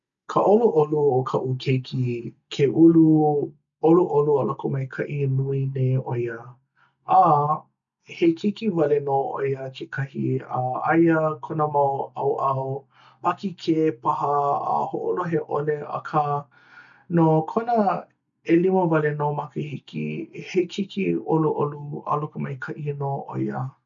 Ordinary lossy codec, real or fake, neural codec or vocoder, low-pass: none; real; none; 7.2 kHz